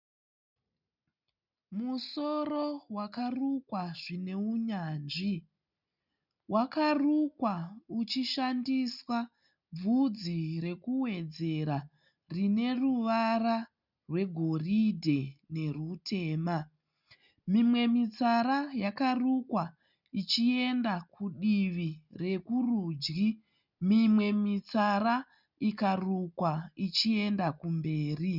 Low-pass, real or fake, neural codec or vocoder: 5.4 kHz; real; none